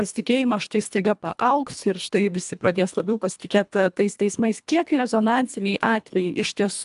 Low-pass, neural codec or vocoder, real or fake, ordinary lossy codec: 10.8 kHz; codec, 24 kHz, 1.5 kbps, HILCodec; fake; AAC, 96 kbps